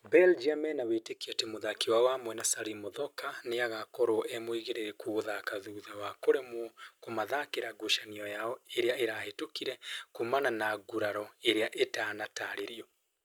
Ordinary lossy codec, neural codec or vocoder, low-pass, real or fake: none; none; none; real